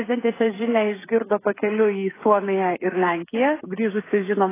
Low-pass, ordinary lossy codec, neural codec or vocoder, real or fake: 3.6 kHz; AAC, 16 kbps; codec, 16 kHz, 16 kbps, FreqCodec, smaller model; fake